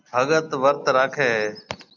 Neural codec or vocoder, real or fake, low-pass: none; real; 7.2 kHz